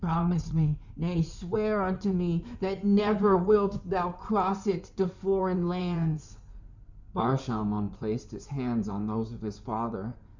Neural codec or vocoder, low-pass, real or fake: codec, 16 kHz in and 24 kHz out, 2.2 kbps, FireRedTTS-2 codec; 7.2 kHz; fake